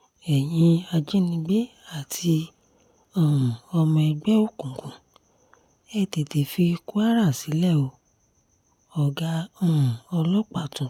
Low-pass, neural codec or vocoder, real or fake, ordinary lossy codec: 19.8 kHz; none; real; none